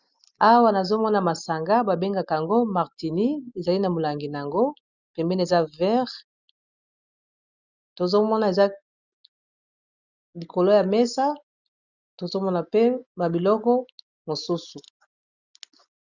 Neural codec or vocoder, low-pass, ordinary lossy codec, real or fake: none; 7.2 kHz; Opus, 64 kbps; real